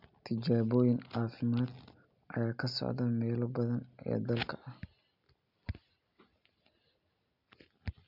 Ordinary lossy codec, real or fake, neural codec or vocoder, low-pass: none; real; none; 5.4 kHz